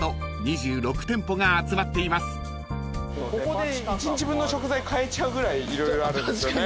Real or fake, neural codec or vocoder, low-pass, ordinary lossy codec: real; none; none; none